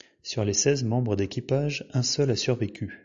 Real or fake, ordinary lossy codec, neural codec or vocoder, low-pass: real; AAC, 64 kbps; none; 7.2 kHz